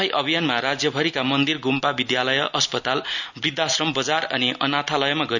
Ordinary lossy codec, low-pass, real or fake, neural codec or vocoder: none; 7.2 kHz; real; none